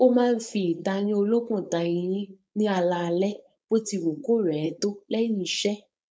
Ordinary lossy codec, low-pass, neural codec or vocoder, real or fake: none; none; codec, 16 kHz, 4.8 kbps, FACodec; fake